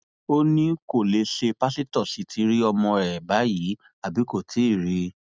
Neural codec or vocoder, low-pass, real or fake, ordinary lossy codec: none; none; real; none